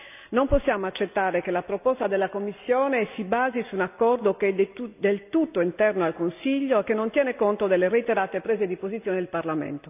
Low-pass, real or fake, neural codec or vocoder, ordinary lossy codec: 3.6 kHz; real; none; none